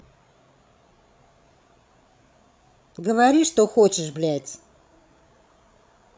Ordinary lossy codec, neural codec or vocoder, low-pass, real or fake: none; codec, 16 kHz, 16 kbps, FreqCodec, larger model; none; fake